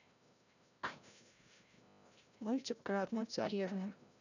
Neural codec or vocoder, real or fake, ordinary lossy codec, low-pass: codec, 16 kHz, 0.5 kbps, FreqCodec, larger model; fake; none; 7.2 kHz